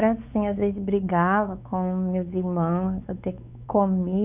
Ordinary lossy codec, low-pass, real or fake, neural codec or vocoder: none; 3.6 kHz; fake; codec, 16 kHz, 4 kbps, X-Codec, WavLM features, trained on Multilingual LibriSpeech